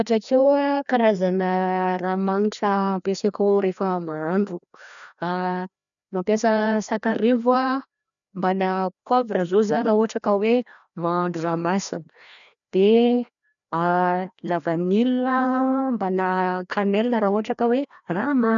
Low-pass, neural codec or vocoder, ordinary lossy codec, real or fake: 7.2 kHz; codec, 16 kHz, 1 kbps, FreqCodec, larger model; none; fake